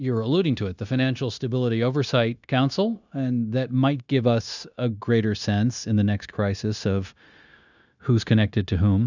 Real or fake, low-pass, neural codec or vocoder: fake; 7.2 kHz; codec, 16 kHz, 0.9 kbps, LongCat-Audio-Codec